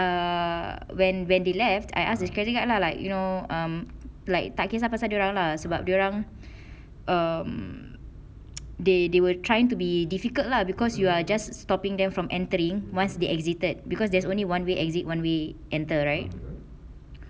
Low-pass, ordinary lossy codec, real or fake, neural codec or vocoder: none; none; real; none